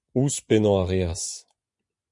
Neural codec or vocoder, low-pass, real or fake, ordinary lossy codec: vocoder, 24 kHz, 100 mel bands, Vocos; 10.8 kHz; fake; MP3, 64 kbps